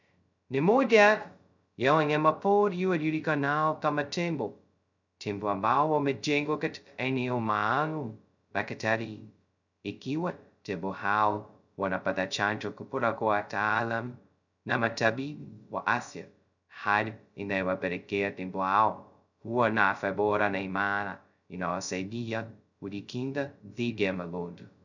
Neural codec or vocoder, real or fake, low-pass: codec, 16 kHz, 0.2 kbps, FocalCodec; fake; 7.2 kHz